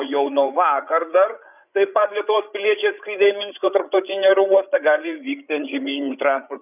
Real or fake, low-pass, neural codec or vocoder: fake; 3.6 kHz; codec, 16 kHz, 8 kbps, FreqCodec, larger model